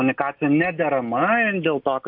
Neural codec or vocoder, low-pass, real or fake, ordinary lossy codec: none; 5.4 kHz; real; MP3, 48 kbps